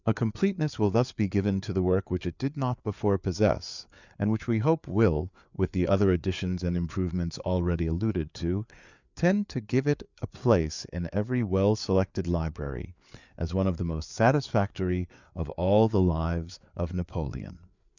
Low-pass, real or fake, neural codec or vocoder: 7.2 kHz; fake; codec, 16 kHz, 4 kbps, FreqCodec, larger model